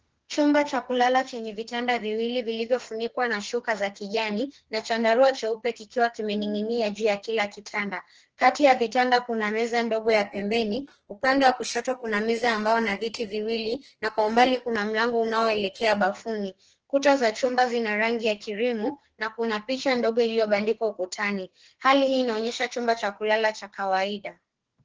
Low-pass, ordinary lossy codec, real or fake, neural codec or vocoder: 7.2 kHz; Opus, 16 kbps; fake; codec, 32 kHz, 1.9 kbps, SNAC